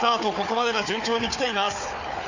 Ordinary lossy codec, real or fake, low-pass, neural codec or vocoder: none; fake; 7.2 kHz; codec, 16 kHz, 4 kbps, FunCodec, trained on Chinese and English, 50 frames a second